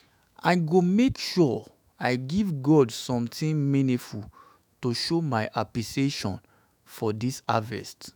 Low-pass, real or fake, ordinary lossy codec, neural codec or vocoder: none; fake; none; autoencoder, 48 kHz, 128 numbers a frame, DAC-VAE, trained on Japanese speech